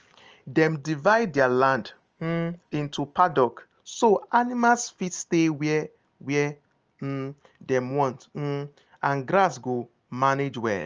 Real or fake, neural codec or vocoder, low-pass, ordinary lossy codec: real; none; 7.2 kHz; Opus, 24 kbps